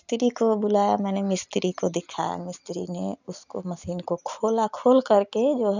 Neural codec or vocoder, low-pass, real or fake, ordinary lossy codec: none; 7.2 kHz; real; none